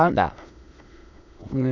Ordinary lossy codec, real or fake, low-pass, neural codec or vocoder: none; fake; 7.2 kHz; autoencoder, 22.05 kHz, a latent of 192 numbers a frame, VITS, trained on many speakers